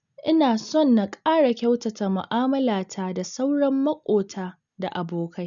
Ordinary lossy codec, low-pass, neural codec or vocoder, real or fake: none; 7.2 kHz; none; real